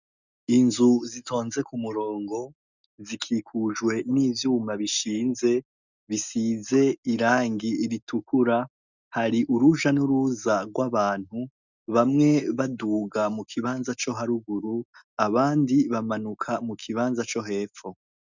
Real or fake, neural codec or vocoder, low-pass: real; none; 7.2 kHz